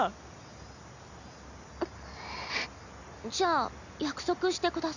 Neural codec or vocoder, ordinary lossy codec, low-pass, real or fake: none; none; 7.2 kHz; real